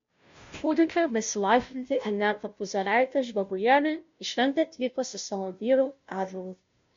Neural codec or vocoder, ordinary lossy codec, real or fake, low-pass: codec, 16 kHz, 0.5 kbps, FunCodec, trained on Chinese and English, 25 frames a second; MP3, 48 kbps; fake; 7.2 kHz